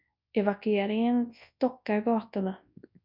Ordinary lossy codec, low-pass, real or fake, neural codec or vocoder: MP3, 48 kbps; 5.4 kHz; fake; codec, 24 kHz, 0.9 kbps, WavTokenizer, large speech release